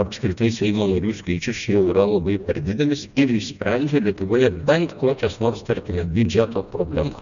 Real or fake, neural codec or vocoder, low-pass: fake; codec, 16 kHz, 1 kbps, FreqCodec, smaller model; 7.2 kHz